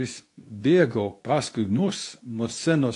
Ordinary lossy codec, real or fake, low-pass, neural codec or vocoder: AAC, 48 kbps; fake; 10.8 kHz; codec, 24 kHz, 0.9 kbps, WavTokenizer, medium speech release version 2